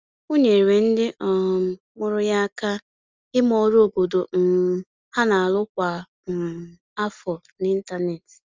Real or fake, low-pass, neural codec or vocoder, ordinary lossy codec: real; 7.2 kHz; none; Opus, 32 kbps